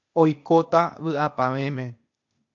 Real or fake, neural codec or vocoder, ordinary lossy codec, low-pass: fake; codec, 16 kHz, 0.8 kbps, ZipCodec; MP3, 48 kbps; 7.2 kHz